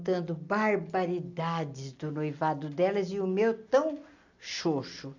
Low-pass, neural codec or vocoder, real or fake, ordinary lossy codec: 7.2 kHz; none; real; AAC, 48 kbps